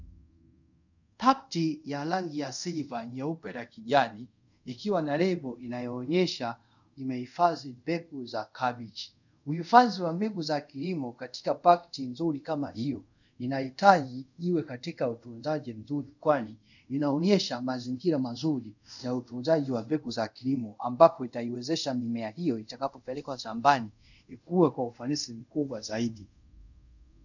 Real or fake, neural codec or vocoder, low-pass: fake; codec, 24 kHz, 0.5 kbps, DualCodec; 7.2 kHz